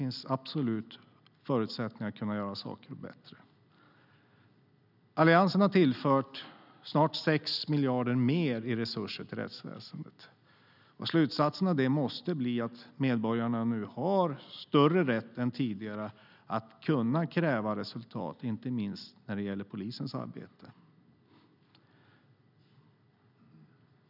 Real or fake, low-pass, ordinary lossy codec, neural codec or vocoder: real; 5.4 kHz; none; none